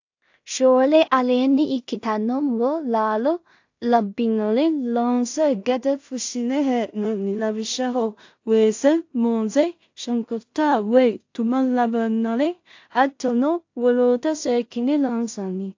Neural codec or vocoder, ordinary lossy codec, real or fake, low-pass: codec, 16 kHz in and 24 kHz out, 0.4 kbps, LongCat-Audio-Codec, two codebook decoder; AAC, 48 kbps; fake; 7.2 kHz